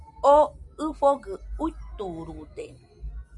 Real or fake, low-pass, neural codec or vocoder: real; 10.8 kHz; none